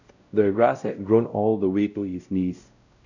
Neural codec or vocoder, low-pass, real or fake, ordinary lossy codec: codec, 16 kHz, 0.5 kbps, X-Codec, WavLM features, trained on Multilingual LibriSpeech; 7.2 kHz; fake; none